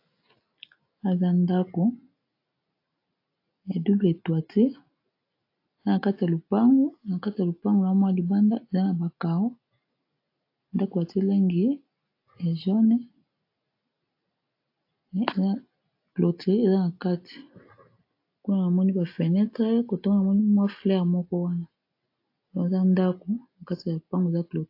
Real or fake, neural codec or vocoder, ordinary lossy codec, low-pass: real; none; AAC, 32 kbps; 5.4 kHz